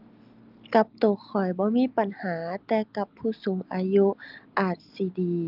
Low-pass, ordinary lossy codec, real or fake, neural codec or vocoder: 5.4 kHz; Opus, 24 kbps; real; none